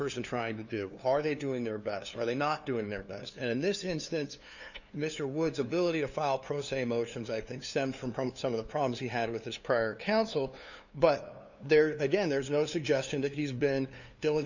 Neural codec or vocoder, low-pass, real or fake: codec, 16 kHz, 2 kbps, FunCodec, trained on LibriTTS, 25 frames a second; 7.2 kHz; fake